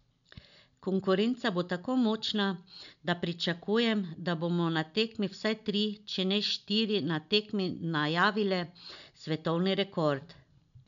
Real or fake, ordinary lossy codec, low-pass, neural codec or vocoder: real; none; 7.2 kHz; none